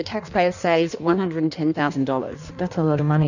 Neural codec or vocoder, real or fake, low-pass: codec, 16 kHz in and 24 kHz out, 1.1 kbps, FireRedTTS-2 codec; fake; 7.2 kHz